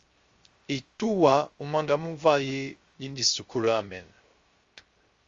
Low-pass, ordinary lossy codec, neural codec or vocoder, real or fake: 7.2 kHz; Opus, 32 kbps; codec, 16 kHz, 0.3 kbps, FocalCodec; fake